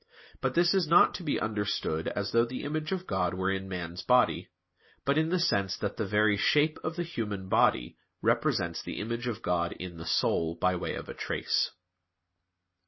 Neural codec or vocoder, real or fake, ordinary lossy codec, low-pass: none; real; MP3, 24 kbps; 7.2 kHz